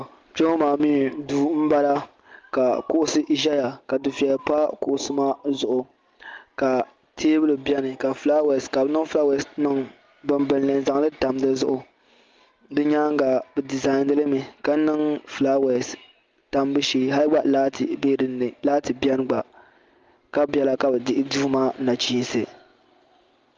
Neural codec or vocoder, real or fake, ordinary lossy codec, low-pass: none; real; Opus, 32 kbps; 7.2 kHz